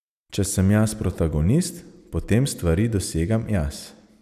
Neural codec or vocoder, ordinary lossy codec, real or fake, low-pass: none; none; real; 14.4 kHz